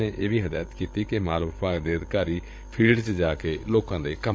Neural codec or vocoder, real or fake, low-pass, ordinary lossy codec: codec, 16 kHz, 16 kbps, FreqCodec, larger model; fake; none; none